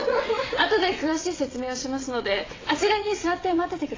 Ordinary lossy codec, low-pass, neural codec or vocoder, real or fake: AAC, 32 kbps; 7.2 kHz; vocoder, 22.05 kHz, 80 mel bands, WaveNeXt; fake